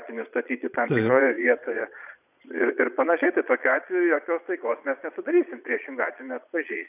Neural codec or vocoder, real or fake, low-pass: vocoder, 22.05 kHz, 80 mel bands, Vocos; fake; 3.6 kHz